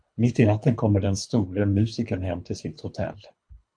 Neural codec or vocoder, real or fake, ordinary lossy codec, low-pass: codec, 24 kHz, 3 kbps, HILCodec; fake; MP3, 64 kbps; 9.9 kHz